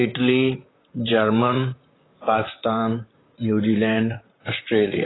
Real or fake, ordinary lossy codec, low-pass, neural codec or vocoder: fake; AAC, 16 kbps; 7.2 kHz; vocoder, 44.1 kHz, 128 mel bands, Pupu-Vocoder